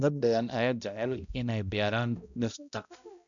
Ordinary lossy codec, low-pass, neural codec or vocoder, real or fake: none; 7.2 kHz; codec, 16 kHz, 0.5 kbps, X-Codec, HuBERT features, trained on balanced general audio; fake